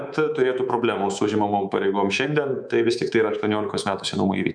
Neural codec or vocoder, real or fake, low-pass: codec, 24 kHz, 3.1 kbps, DualCodec; fake; 9.9 kHz